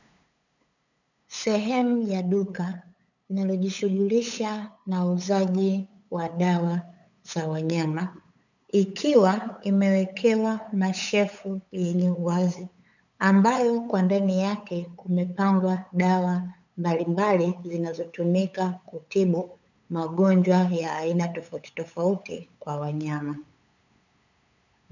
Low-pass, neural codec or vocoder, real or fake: 7.2 kHz; codec, 16 kHz, 8 kbps, FunCodec, trained on LibriTTS, 25 frames a second; fake